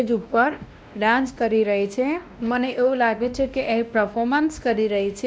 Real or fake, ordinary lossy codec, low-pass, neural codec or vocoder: fake; none; none; codec, 16 kHz, 1 kbps, X-Codec, WavLM features, trained on Multilingual LibriSpeech